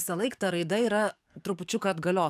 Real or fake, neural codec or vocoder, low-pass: fake; codec, 44.1 kHz, 7.8 kbps, DAC; 14.4 kHz